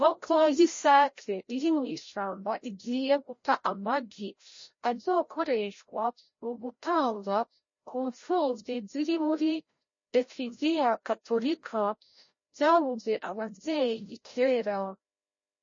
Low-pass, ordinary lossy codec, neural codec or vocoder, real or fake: 7.2 kHz; MP3, 32 kbps; codec, 16 kHz, 0.5 kbps, FreqCodec, larger model; fake